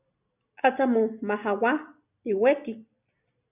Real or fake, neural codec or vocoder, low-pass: real; none; 3.6 kHz